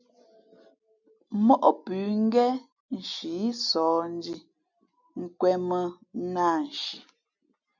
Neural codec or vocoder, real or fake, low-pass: none; real; 7.2 kHz